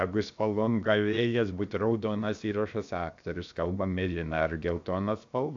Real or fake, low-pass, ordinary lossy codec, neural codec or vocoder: fake; 7.2 kHz; AAC, 64 kbps; codec, 16 kHz, about 1 kbps, DyCAST, with the encoder's durations